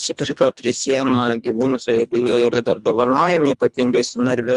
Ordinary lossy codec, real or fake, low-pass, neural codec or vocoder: Opus, 64 kbps; fake; 10.8 kHz; codec, 24 kHz, 1.5 kbps, HILCodec